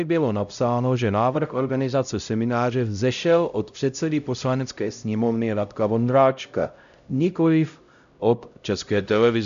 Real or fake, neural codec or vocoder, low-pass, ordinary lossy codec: fake; codec, 16 kHz, 0.5 kbps, X-Codec, HuBERT features, trained on LibriSpeech; 7.2 kHz; AAC, 96 kbps